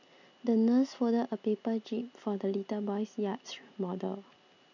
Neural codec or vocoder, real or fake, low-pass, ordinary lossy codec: none; real; 7.2 kHz; none